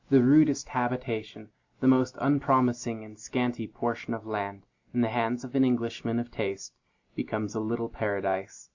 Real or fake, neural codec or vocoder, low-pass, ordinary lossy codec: real; none; 7.2 kHz; Opus, 64 kbps